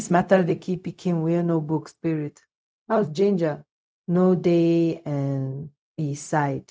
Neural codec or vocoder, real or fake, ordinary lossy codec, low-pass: codec, 16 kHz, 0.4 kbps, LongCat-Audio-Codec; fake; none; none